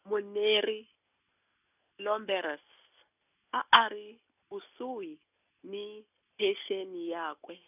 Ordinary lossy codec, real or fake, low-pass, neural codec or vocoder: none; real; 3.6 kHz; none